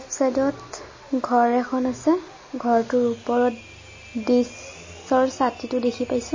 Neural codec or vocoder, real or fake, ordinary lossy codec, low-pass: none; real; MP3, 32 kbps; 7.2 kHz